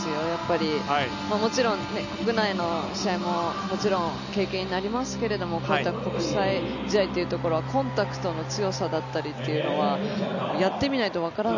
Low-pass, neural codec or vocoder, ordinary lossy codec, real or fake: 7.2 kHz; none; none; real